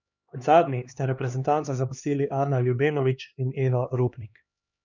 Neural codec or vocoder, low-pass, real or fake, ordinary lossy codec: codec, 16 kHz, 2 kbps, X-Codec, HuBERT features, trained on LibriSpeech; 7.2 kHz; fake; none